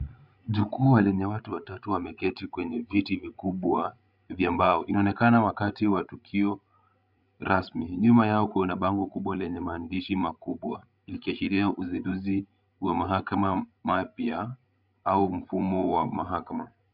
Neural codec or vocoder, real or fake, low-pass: codec, 16 kHz, 16 kbps, FreqCodec, larger model; fake; 5.4 kHz